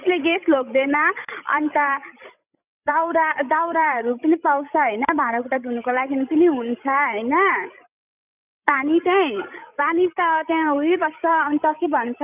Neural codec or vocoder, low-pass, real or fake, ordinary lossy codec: codec, 16 kHz, 16 kbps, FreqCodec, larger model; 3.6 kHz; fake; none